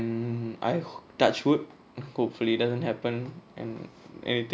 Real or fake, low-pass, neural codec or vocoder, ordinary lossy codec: real; none; none; none